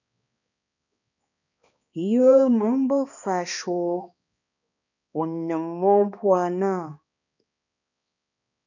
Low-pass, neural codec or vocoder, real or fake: 7.2 kHz; codec, 16 kHz, 2 kbps, X-Codec, HuBERT features, trained on balanced general audio; fake